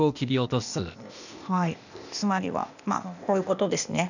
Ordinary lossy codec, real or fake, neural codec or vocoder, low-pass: none; fake; codec, 16 kHz, 0.8 kbps, ZipCodec; 7.2 kHz